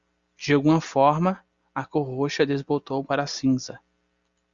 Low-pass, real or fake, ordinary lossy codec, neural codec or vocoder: 7.2 kHz; real; Opus, 64 kbps; none